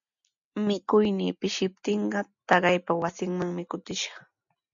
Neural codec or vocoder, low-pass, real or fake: none; 7.2 kHz; real